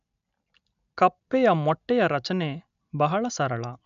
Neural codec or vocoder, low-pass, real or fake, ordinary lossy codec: none; 7.2 kHz; real; none